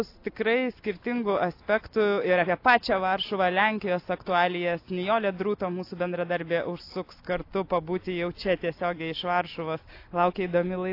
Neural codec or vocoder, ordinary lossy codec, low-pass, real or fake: none; AAC, 32 kbps; 5.4 kHz; real